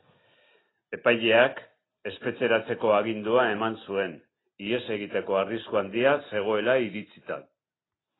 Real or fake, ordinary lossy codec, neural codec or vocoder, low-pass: real; AAC, 16 kbps; none; 7.2 kHz